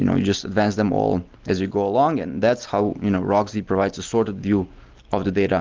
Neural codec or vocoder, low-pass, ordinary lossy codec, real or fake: none; 7.2 kHz; Opus, 32 kbps; real